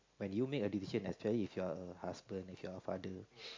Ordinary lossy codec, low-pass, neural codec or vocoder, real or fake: MP3, 48 kbps; 7.2 kHz; none; real